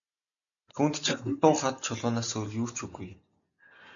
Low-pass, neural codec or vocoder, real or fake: 7.2 kHz; none; real